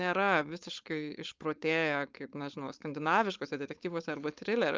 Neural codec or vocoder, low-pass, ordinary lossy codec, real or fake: codec, 16 kHz, 4.8 kbps, FACodec; 7.2 kHz; Opus, 24 kbps; fake